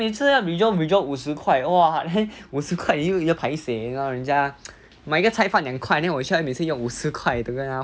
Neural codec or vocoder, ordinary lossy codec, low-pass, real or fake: none; none; none; real